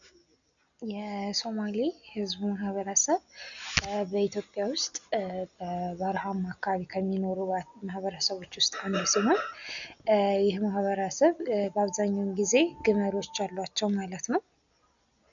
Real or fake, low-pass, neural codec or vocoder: real; 7.2 kHz; none